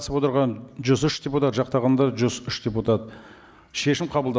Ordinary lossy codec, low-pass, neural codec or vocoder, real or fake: none; none; none; real